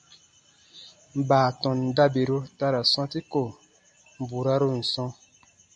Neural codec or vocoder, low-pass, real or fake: none; 9.9 kHz; real